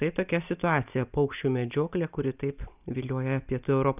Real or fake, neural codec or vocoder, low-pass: real; none; 3.6 kHz